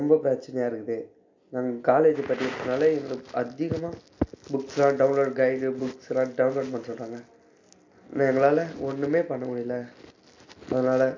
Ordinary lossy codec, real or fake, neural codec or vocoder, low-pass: MP3, 48 kbps; real; none; 7.2 kHz